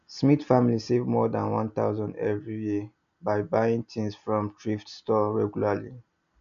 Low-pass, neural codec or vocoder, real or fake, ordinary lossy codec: 7.2 kHz; none; real; none